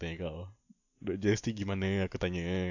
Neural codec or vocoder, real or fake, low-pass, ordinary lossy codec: none; real; 7.2 kHz; none